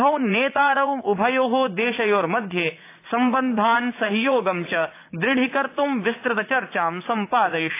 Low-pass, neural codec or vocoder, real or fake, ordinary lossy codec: 3.6 kHz; vocoder, 44.1 kHz, 80 mel bands, Vocos; fake; AAC, 24 kbps